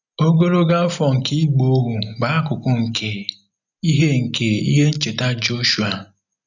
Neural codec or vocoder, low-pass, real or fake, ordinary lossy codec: none; 7.2 kHz; real; none